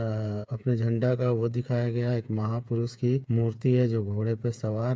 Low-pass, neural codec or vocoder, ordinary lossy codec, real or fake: none; codec, 16 kHz, 8 kbps, FreqCodec, smaller model; none; fake